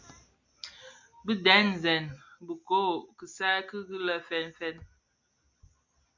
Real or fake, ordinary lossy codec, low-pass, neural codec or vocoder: real; MP3, 64 kbps; 7.2 kHz; none